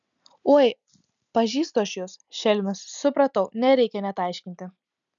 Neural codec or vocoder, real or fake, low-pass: none; real; 7.2 kHz